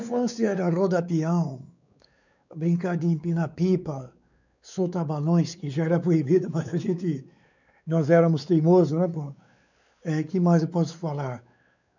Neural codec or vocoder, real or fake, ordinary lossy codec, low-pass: codec, 16 kHz, 4 kbps, X-Codec, WavLM features, trained on Multilingual LibriSpeech; fake; none; 7.2 kHz